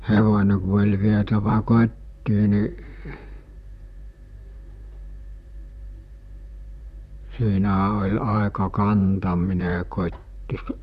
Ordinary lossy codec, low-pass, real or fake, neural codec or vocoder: none; 14.4 kHz; fake; vocoder, 44.1 kHz, 128 mel bands, Pupu-Vocoder